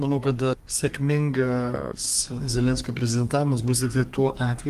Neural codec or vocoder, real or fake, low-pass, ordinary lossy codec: codec, 32 kHz, 1.9 kbps, SNAC; fake; 14.4 kHz; Opus, 32 kbps